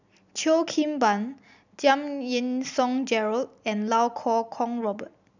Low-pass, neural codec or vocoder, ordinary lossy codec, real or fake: 7.2 kHz; none; none; real